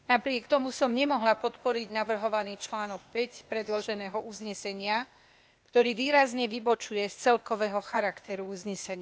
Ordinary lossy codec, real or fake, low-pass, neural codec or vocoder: none; fake; none; codec, 16 kHz, 0.8 kbps, ZipCodec